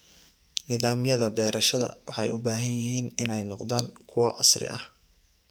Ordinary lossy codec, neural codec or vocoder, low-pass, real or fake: none; codec, 44.1 kHz, 2.6 kbps, SNAC; none; fake